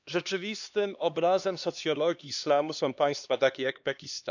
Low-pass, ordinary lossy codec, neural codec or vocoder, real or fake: 7.2 kHz; none; codec, 16 kHz, 2 kbps, X-Codec, HuBERT features, trained on LibriSpeech; fake